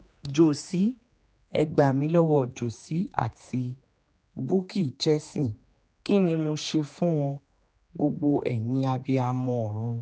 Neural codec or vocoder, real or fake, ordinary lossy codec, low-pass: codec, 16 kHz, 2 kbps, X-Codec, HuBERT features, trained on general audio; fake; none; none